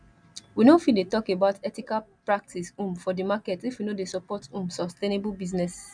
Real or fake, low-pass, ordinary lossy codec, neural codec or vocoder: real; 9.9 kHz; none; none